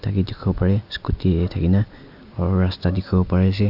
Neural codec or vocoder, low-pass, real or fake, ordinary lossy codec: none; 5.4 kHz; real; AAC, 48 kbps